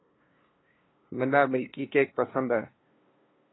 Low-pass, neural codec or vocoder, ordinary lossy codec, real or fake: 7.2 kHz; codec, 16 kHz, 2 kbps, FunCodec, trained on LibriTTS, 25 frames a second; AAC, 16 kbps; fake